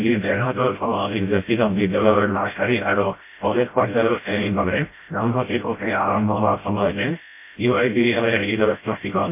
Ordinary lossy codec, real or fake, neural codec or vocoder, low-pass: MP3, 24 kbps; fake; codec, 16 kHz, 0.5 kbps, FreqCodec, smaller model; 3.6 kHz